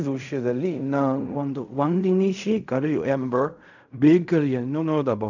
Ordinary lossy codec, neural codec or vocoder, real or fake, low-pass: none; codec, 16 kHz in and 24 kHz out, 0.4 kbps, LongCat-Audio-Codec, fine tuned four codebook decoder; fake; 7.2 kHz